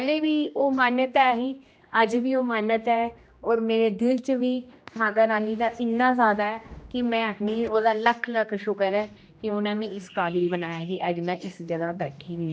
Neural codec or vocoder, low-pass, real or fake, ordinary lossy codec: codec, 16 kHz, 1 kbps, X-Codec, HuBERT features, trained on general audio; none; fake; none